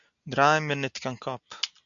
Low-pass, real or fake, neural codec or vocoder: 7.2 kHz; real; none